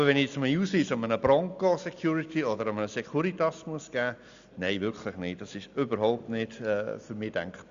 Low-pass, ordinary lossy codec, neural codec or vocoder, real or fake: 7.2 kHz; Opus, 64 kbps; none; real